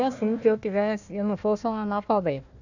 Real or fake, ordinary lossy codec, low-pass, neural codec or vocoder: fake; none; 7.2 kHz; codec, 16 kHz, 1 kbps, FunCodec, trained on Chinese and English, 50 frames a second